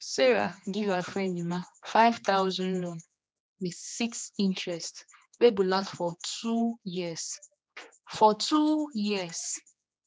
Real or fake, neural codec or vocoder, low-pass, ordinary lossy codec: fake; codec, 16 kHz, 2 kbps, X-Codec, HuBERT features, trained on general audio; none; none